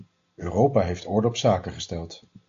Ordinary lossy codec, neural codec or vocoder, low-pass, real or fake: AAC, 48 kbps; none; 7.2 kHz; real